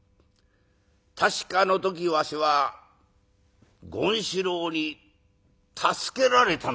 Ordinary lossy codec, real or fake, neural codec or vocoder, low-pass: none; real; none; none